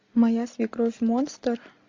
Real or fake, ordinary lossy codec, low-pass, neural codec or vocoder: real; MP3, 48 kbps; 7.2 kHz; none